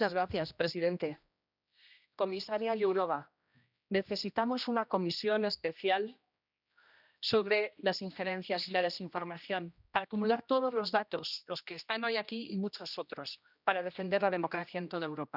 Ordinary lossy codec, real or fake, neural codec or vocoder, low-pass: none; fake; codec, 16 kHz, 1 kbps, X-Codec, HuBERT features, trained on general audio; 5.4 kHz